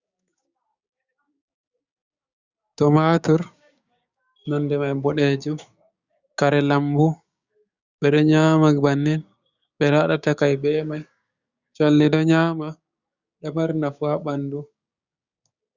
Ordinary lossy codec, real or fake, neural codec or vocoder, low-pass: Opus, 64 kbps; fake; codec, 16 kHz, 6 kbps, DAC; 7.2 kHz